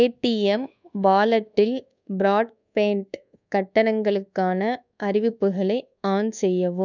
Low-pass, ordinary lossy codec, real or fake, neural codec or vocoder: 7.2 kHz; none; fake; codec, 24 kHz, 1.2 kbps, DualCodec